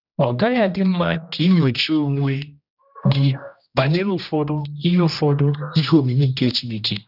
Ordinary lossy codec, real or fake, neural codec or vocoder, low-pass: none; fake; codec, 16 kHz, 1 kbps, X-Codec, HuBERT features, trained on general audio; 5.4 kHz